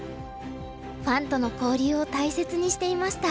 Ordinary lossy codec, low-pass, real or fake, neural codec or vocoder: none; none; real; none